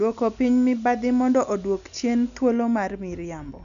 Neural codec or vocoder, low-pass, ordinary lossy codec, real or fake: none; 7.2 kHz; none; real